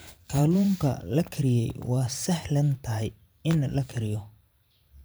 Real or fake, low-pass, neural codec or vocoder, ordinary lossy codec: fake; none; vocoder, 44.1 kHz, 128 mel bands every 512 samples, BigVGAN v2; none